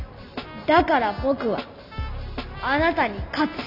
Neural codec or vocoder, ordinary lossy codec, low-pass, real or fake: none; none; 5.4 kHz; real